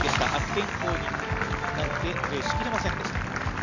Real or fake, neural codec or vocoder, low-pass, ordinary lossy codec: real; none; 7.2 kHz; none